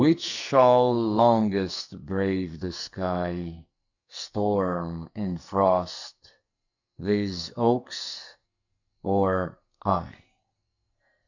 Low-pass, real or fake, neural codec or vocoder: 7.2 kHz; fake; codec, 44.1 kHz, 2.6 kbps, SNAC